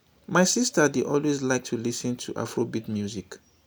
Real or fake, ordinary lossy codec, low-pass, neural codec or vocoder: real; none; none; none